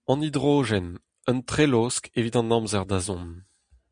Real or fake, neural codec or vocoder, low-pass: real; none; 9.9 kHz